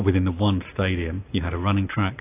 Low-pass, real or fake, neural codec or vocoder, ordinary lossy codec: 3.6 kHz; real; none; AAC, 24 kbps